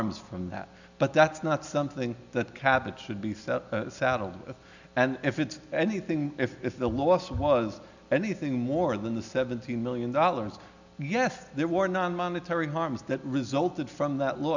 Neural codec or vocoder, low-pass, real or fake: none; 7.2 kHz; real